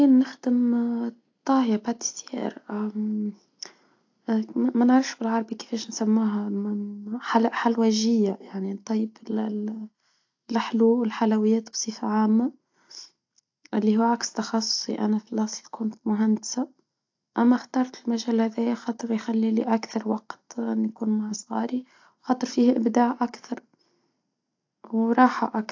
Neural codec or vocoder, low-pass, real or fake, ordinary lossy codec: none; 7.2 kHz; real; AAC, 48 kbps